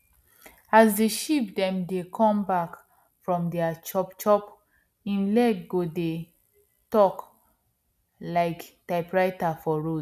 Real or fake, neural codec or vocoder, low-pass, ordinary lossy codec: real; none; 14.4 kHz; none